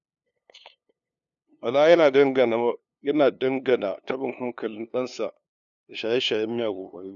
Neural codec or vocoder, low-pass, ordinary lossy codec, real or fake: codec, 16 kHz, 2 kbps, FunCodec, trained on LibriTTS, 25 frames a second; 7.2 kHz; none; fake